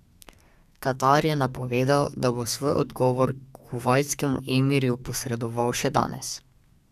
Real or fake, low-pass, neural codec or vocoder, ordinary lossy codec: fake; 14.4 kHz; codec, 32 kHz, 1.9 kbps, SNAC; none